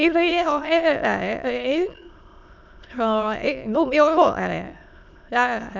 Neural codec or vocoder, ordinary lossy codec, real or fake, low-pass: autoencoder, 22.05 kHz, a latent of 192 numbers a frame, VITS, trained on many speakers; none; fake; 7.2 kHz